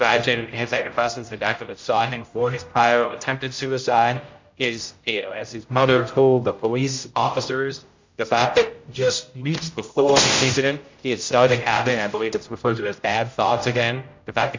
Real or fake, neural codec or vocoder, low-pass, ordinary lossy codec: fake; codec, 16 kHz, 0.5 kbps, X-Codec, HuBERT features, trained on general audio; 7.2 kHz; MP3, 64 kbps